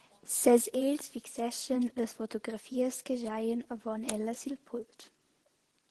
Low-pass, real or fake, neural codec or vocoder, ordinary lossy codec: 10.8 kHz; fake; vocoder, 24 kHz, 100 mel bands, Vocos; Opus, 16 kbps